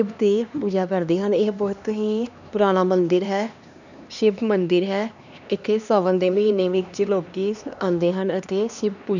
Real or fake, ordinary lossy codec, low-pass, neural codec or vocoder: fake; none; 7.2 kHz; codec, 16 kHz, 2 kbps, X-Codec, HuBERT features, trained on LibriSpeech